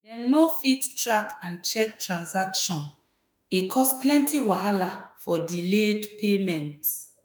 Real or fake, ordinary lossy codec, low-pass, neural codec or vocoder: fake; none; none; autoencoder, 48 kHz, 32 numbers a frame, DAC-VAE, trained on Japanese speech